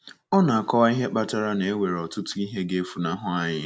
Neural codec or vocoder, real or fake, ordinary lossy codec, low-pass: none; real; none; none